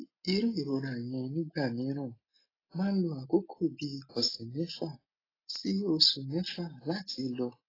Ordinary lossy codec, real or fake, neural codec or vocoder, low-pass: AAC, 24 kbps; real; none; 5.4 kHz